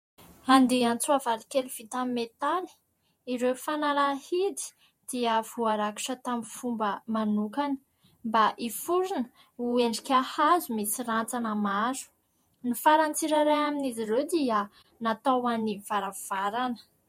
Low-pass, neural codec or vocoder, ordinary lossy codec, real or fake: 19.8 kHz; vocoder, 48 kHz, 128 mel bands, Vocos; MP3, 64 kbps; fake